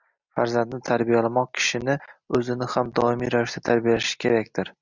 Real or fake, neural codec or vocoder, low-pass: real; none; 7.2 kHz